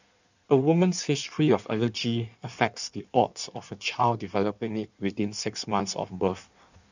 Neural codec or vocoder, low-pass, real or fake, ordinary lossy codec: codec, 16 kHz in and 24 kHz out, 1.1 kbps, FireRedTTS-2 codec; 7.2 kHz; fake; none